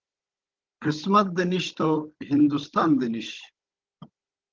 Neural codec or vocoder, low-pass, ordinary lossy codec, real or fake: codec, 16 kHz, 16 kbps, FunCodec, trained on Chinese and English, 50 frames a second; 7.2 kHz; Opus, 16 kbps; fake